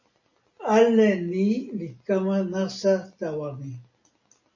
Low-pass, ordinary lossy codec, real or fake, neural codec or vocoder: 7.2 kHz; MP3, 32 kbps; real; none